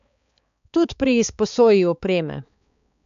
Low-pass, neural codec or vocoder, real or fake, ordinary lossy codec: 7.2 kHz; codec, 16 kHz, 4 kbps, X-Codec, HuBERT features, trained on balanced general audio; fake; AAC, 96 kbps